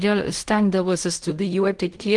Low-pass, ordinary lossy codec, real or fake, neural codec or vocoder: 10.8 kHz; Opus, 24 kbps; fake; codec, 16 kHz in and 24 kHz out, 0.4 kbps, LongCat-Audio-Codec, fine tuned four codebook decoder